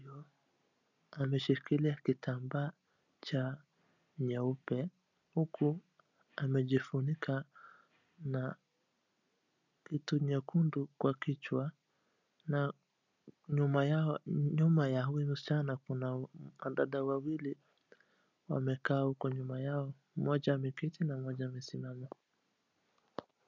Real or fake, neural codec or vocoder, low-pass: real; none; 7.2 kHz